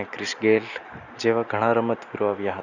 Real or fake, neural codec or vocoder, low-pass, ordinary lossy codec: real; none; 7.2 kHz; none